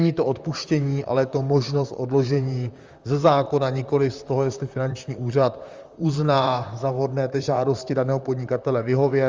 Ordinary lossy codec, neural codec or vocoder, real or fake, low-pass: Opus, 32 kbps; vocoder, 44.1 kHz, 128 mel bands, Pupu-Vocoder; fake; 7.2 kHz